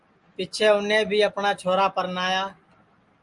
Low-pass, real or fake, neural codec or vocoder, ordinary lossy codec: 10.8 kHz; real; none; Opus, 32 kbps